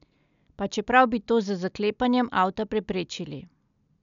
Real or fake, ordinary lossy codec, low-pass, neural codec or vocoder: real; none; 7.2 kHz; none